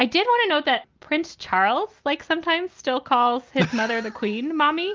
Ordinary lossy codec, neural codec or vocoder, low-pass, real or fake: Opus, 32 kbps; none; 7.2 kHz; real